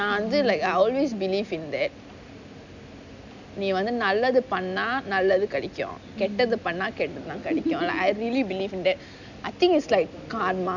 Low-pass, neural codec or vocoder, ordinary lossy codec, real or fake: 7.2 kHz; none; none; real